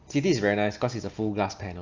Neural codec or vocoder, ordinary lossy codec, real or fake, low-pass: none; Opus, 32 kbps; real; 7.2 kHz